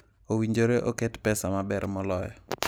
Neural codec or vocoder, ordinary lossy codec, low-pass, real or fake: none; none; none; real